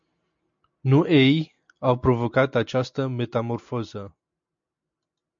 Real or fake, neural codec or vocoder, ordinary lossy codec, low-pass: real; none; MP3, 64 kbps; 7.2 kHz